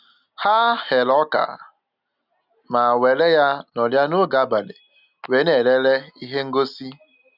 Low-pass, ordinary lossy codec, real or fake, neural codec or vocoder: 5.4 kHz; none; real; none